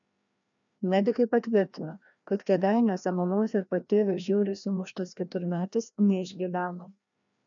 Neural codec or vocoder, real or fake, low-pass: codec, 16 kHz, 1 kbps, FreqCodec, larger model; fake; 7.2 kHz